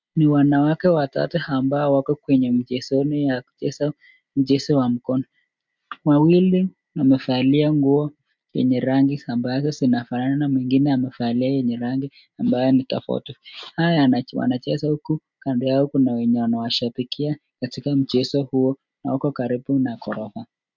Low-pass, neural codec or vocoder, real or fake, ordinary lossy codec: 7.2 kHz; none; real; MP3, 64 kbps